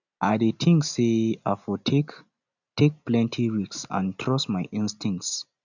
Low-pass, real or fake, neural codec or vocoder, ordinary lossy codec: 7.2 kHz; real; none; none